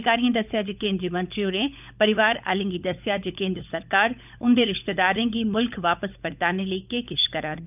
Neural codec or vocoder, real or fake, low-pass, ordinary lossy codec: codec, 16 kHz, 16 kbps, FunCodec, trained on LibriTTS, 50 frames a second; fake; 3.6 kHz; none